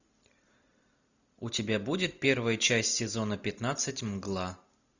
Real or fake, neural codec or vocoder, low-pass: real; none; 7.2 kHz